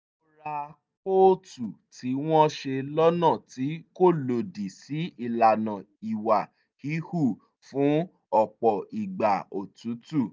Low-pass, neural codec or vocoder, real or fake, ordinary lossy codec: none; none; real; none